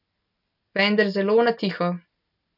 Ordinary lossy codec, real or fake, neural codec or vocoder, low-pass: none; real; none; 5.4 kHz